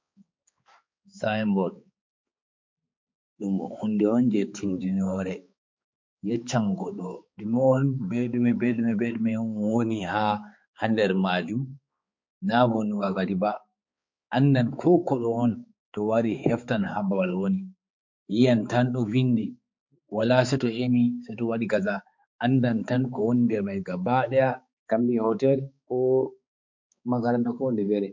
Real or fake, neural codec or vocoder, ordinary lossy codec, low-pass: fake; codec, 16 kHz, 4 kbps, X-Codec, HuBERT features, trained on balanced general audio; MP3, 48 kbps; 7.2 kHz